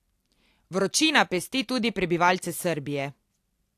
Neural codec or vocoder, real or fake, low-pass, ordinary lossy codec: none; real; 14.4 kHz; AAC, 64 kbps